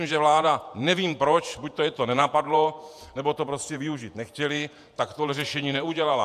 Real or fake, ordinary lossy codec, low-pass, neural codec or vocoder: fake; AAC, 96 kbps; 14.4 kHz; vocoder, 48 kHz, 128 mel bands, Vocos